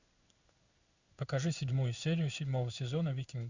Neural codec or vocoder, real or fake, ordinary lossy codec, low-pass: codec, 16 kHz in and 24 kHz out, 1 kbps, XY-Tokenizer; fake; Opus, 64 kbps; 7.2 kHz